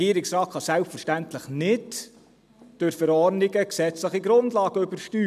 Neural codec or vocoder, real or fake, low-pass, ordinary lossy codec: none; real; 14.4 kHz; none